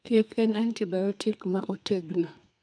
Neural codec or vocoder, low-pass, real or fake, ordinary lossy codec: codec, 32 kHz, 1.9 kbps, SNAC; 9.9 kHz; fake; none